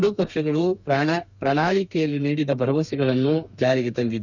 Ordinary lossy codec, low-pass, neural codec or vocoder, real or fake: none; 7.2 kHz; codec, 32 kHz, 1.9 kbps, SNAC; fake